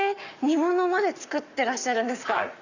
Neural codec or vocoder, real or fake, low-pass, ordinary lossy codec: codec, 44.1 kHz, 7.8 kbps, Pupu-Codec; fake; 7.2 kHz; none